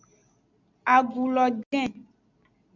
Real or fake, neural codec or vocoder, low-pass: real; none; 7.2 kHz